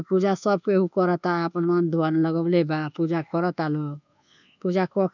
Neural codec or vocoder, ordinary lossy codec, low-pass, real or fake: codec, 24 kHz, 1.2 kbps, DualCodec; none; 7.2 kHz; fake